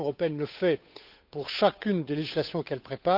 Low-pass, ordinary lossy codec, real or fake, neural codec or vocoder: 5.4 kHz; none; fake; codec, 16 kHz, 8 kbps, FunCodec, trained on Chinese and English, 25 frames a second